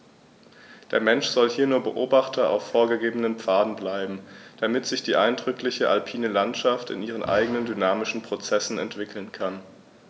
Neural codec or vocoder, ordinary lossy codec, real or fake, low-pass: none; none; real; none